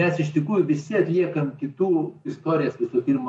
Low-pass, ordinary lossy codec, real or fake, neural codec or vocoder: 10.8 kHz; MP3, 48 kbps; real; none